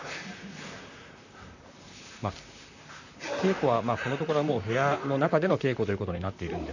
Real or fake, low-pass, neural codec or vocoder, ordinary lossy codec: fake; 7.2 kHz; vocoder, 44.1 kHz, 128 mel bands, Pupu-Vocoder; none